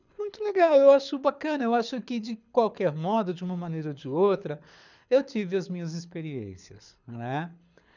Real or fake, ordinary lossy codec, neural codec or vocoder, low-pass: fake; none; codec, 24 kHz, 6 kbps, HILCodec; 7.2 kHz